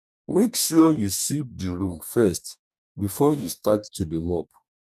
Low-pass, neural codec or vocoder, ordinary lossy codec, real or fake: 14.4 kHz; codec, 44.1 kHz, 2.6 kbps, DAC; none; fake